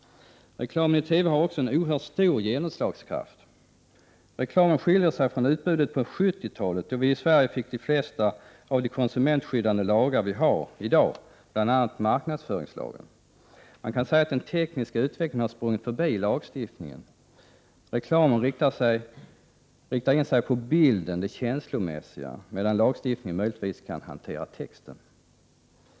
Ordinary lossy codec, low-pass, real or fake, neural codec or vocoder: none; none; real; none